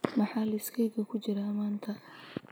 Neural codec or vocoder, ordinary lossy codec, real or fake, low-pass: none; none; real; none